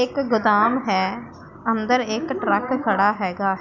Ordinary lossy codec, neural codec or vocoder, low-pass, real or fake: none; none; 7.2 kHz; real